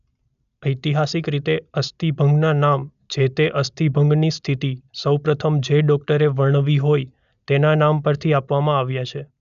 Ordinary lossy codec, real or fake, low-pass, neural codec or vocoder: none; real; 7.2 kHz; none